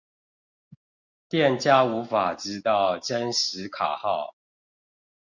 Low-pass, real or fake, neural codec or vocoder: 7.2 kHz; real; none